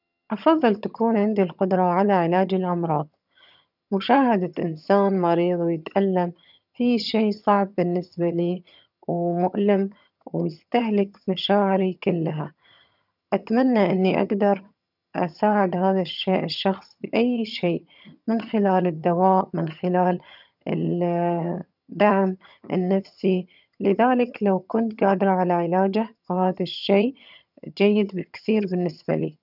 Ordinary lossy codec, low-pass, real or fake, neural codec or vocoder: none; 5.4 kHz; fake; vocoder, 22.05 kHz, 80 mel bands, HiFi-GAN